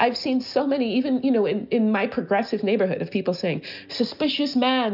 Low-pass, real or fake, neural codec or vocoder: 5.4 kHz; real; none